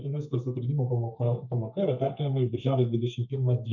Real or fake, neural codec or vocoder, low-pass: fake; codec, 32 kHz, 1.9 kbps, SNAC; 7.2 kHz